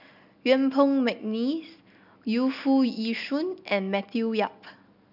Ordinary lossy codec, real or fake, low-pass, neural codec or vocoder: none; real; 5.4 kHz; none